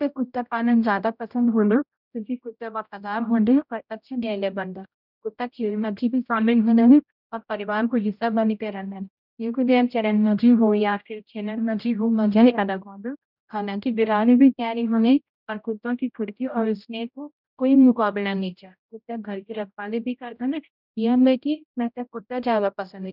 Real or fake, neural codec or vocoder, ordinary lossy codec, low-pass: fake; codec, 16 kHz, 0.5 kbps, X-Codec, HuBERT features, trained on general audio; none; 5.4 kHz